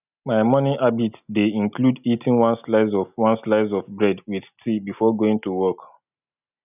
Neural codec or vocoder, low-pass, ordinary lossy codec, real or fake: none; 3.6 kHz; none; real